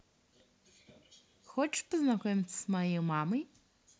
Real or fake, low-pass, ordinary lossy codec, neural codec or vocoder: real; none; none; none